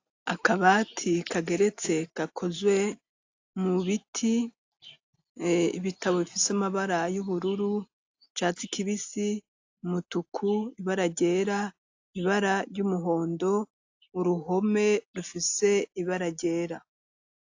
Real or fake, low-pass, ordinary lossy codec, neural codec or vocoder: real; 7.2 kHz; AAC, 48 kbps; none